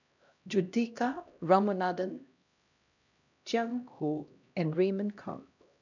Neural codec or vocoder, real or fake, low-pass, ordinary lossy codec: codec, 16 kHz, 1 kbps, X-Codec, HuBERT features, trained on LibriSpeech; fake; 7.2 kHz; none